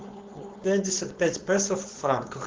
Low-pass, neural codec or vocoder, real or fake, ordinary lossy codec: 7.2 kHz; codec, 16 kHz, 4.8 kbps, FACodec; fake; Opus, 16 kbps